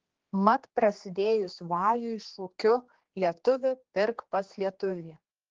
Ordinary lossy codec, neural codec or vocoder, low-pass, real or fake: Opus, 16 kbps; codec, 16 kHz, 2 kbps, X-Codec, HuBERT features, trained on general audio; 7.2 kHz; fake